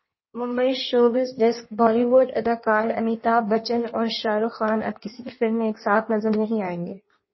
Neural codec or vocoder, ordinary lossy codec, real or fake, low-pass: codec, 16 kHz in and 24 kHz out, 1.1 kbps, FireRedTTS-2 codec; MP3, 24 kbps; fake; 7.2 kHz